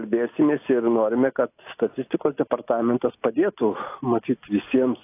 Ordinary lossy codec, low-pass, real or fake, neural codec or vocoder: AAC, 24 kbps; 3.6 kHz; real; none